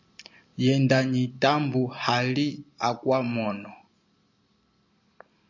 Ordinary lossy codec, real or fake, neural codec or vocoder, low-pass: MP3, 64 kbps; real; none; 7.2 kHz